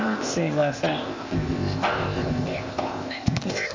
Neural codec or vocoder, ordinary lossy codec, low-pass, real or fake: codec, 16 kHz, 0.8 kbps, ZipCodec; MP3, 48 kbps; 7.2 kHz; fake